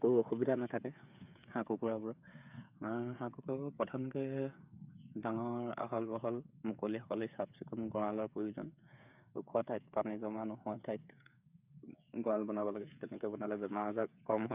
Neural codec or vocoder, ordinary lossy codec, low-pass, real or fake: codec, 16 kHz, 8 kbps, FreqCodec, smaller model; none; 3.6 kHz; fake